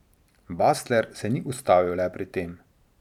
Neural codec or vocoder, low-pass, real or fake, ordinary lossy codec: vocoder, 44.1 kHz, 128 mel bands every 512 samples, BigVGAN v2; 19.8 kHz; fake; none